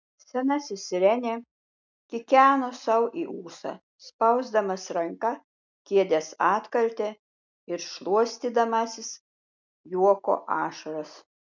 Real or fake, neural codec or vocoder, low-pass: real; none; 7.2 kHz